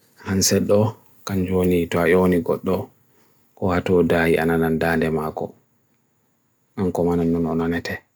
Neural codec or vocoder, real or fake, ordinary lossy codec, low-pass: none; real; none; none